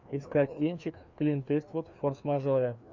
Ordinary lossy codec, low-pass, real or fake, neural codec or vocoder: MP3, 48 kbps; 7.2 kHz; fake; codec, 16 kHz, 2 kbps, FreqCodec, larger model